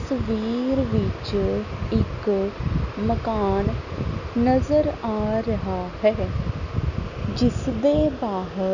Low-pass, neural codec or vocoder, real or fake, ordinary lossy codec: 7.2 kHz; none; real; none